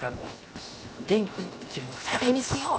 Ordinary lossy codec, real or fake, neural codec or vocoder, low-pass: none; fake; codec, 16 kHz, 0.7 kbps, FocalCodec; none